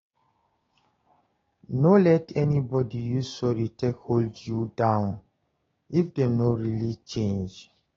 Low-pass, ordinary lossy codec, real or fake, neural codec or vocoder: 7.2 kHz; AAC, 24 kbps; fake; codec, 16 kHz, 6 kbps, DAC